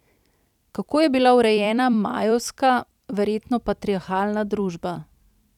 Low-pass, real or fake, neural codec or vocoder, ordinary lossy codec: 19.8 kHz; fake; vocoder, 44.1 kHz, 128 mel bands every 256 samples, BigVGAN v2; none